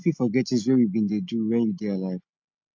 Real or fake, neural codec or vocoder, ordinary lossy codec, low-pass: real; none; MP3, 48 kbps; 7.2 kHz